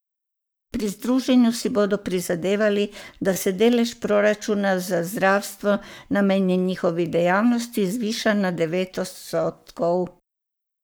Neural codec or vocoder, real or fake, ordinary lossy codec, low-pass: codec, 44.1 kHz, 7.8 kbps, Pupu-Codec; fake; none; none